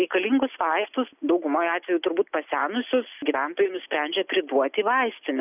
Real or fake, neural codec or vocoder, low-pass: real; none; 3.6 kHz